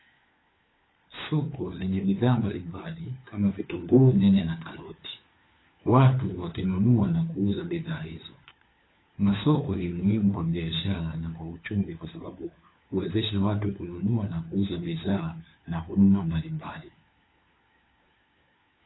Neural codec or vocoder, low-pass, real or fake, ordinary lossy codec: codec, 16 kHz, 4 kbps, FunCodec, trained on LibriTTS, 50 frames a second; 7.2 kHz; fake; AAC, 16 kbps